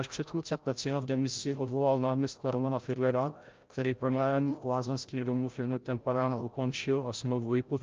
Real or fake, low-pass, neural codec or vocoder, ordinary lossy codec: fake; 7.2 kHz; codec, 16 kHz, 0.5 kbps, FreqCodec, larger model; Opus, 24 kbps